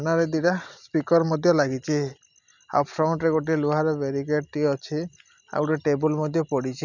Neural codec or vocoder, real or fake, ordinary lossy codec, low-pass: none; real; none; 7.2 kHz